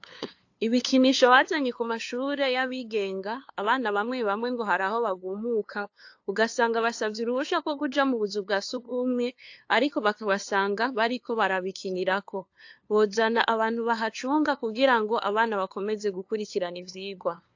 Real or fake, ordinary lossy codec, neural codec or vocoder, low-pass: fake; AAC, 48 kbps; codec, 16 kHz, 2 kbps, FunCodec, trained on LibriTTS, 25 frames a second; 7.2 kHz